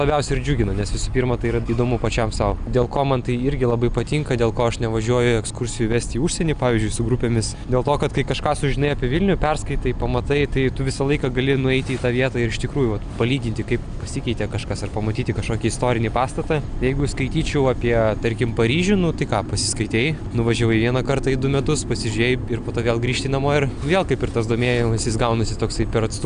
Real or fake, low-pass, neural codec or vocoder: real; 9.9 kHz; none